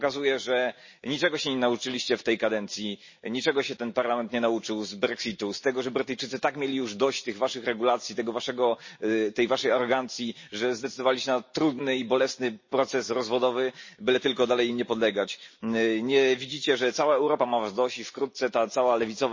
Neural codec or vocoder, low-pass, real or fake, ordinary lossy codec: none; 7.2 kHz; real; MP3, 32 kbps